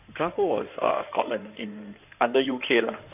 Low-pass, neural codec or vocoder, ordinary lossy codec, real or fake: 3.6 kHz; codec, 16 kHz in and 24 kHz out, 2.2 kbps, FireRedTTS-2 codec; none; fake